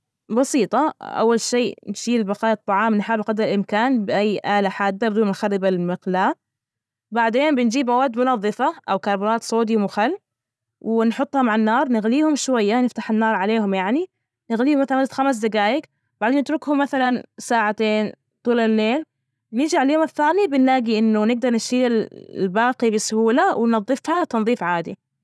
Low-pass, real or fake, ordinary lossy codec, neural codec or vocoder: none; real; none; none